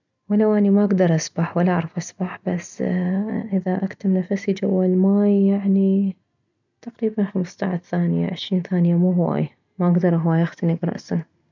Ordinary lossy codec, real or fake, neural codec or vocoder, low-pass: none; real; none; 7.2 kHz